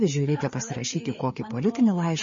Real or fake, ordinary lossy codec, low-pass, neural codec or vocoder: fake; MP3, 32 kbps; 7.2 kHz; codec, 16 kHz, 4 kbps, FreqCodec, larger model